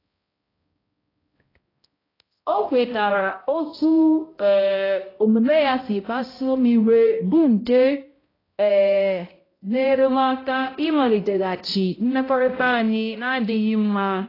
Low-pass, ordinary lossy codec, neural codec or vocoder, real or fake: 5.4 kHz; AAC, 24 kbps; codec, 16 kHz, 0.5 kbps, X-Codec, HuBERT features, trained on balanced general audio; fake